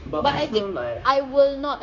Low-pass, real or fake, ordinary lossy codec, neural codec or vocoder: 7.2 kHz; fake; none; codec, 16 kHz, 0.9 kbps, LongCat-Audio-Codec